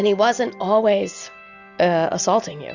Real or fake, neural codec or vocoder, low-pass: real; none; 7.2 kHz